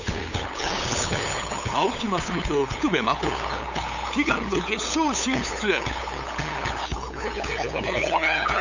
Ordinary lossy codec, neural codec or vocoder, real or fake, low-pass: none; codec, 16 kHz, 8 kbps, FunCodec, trained on LibriTTS, 25 frames a second; fake; 7.2 kHz